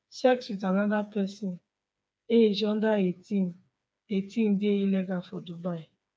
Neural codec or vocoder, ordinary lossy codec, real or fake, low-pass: codec, 16 kHz, 4 kbps, FreqCodec, smaller model; none; fake; none